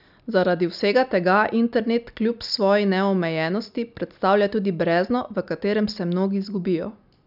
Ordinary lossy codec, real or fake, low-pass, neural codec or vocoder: none; real; 5.4 kHz; none